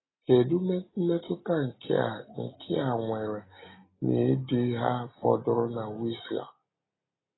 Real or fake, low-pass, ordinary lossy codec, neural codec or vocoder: real; 7.2 kHz; AAC, 16 kbps; none